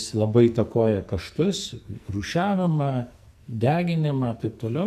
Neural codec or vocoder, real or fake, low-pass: codec, 44.1 kHz, 2.6 kbps, SNAC; fake; 14.4 kHz